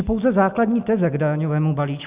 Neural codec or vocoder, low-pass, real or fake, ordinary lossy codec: none; 3.6 kHz; real; Opus, 64 kbps